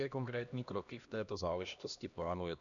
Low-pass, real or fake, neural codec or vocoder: 7.2 kHz; fake; codec, 16 kHz, 1 kbps, X-Codec, HuBERT features, trained on LibriSpeech